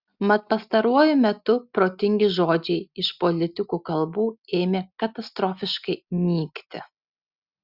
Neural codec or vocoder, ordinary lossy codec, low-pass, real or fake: none; Opus, 64 kbps; 5.4 kHz; real